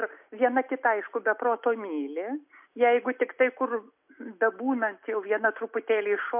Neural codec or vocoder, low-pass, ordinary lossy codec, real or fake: none; 3.6 kHz; MP3, 32 kbps; real